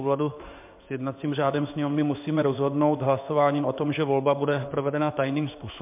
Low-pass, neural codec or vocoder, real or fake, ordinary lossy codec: 3.6 kHz; codec, 16 kHz in and 24 kHz out, 1 kbps, XY-Tokenizer; fake; MP3, 32 kbps